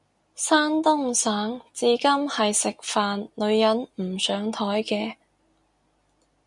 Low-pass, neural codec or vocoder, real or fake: 10.8 kHz; none; real